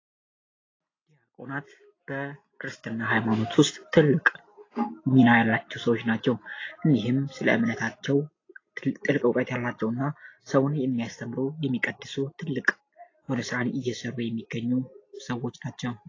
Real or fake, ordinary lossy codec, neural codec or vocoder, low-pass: real; AAC, 32 kbps; none; 7.2 kHz